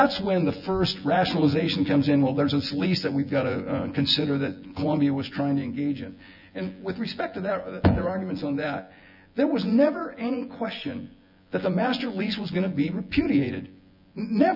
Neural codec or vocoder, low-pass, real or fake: vocoder, 24 kHz, 100 mel bands, Vocos; 5.4 kHz; fake